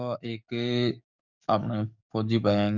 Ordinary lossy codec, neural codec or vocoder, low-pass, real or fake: none; codec, 44.1 kHz, 7.8 kbps, DAC; 7.2 kHz; fake